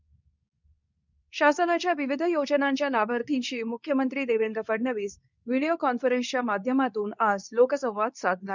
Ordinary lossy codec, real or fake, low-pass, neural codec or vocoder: none; fake; 7.2 kHz; codec, 24 kHz, 0.9 kbps, WavTokenizer, medium speech release version 2